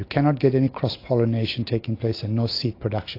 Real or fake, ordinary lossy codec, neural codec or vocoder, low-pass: real; AAC, 32 kbps; none; 5.4 kHz